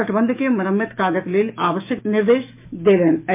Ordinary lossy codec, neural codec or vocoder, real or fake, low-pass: MP3, 32 kbps; autoencoder, 48 kHz, 128 numbers a frame, DAC-VAE, trained on Japanese speech; fake; 3.6 kHz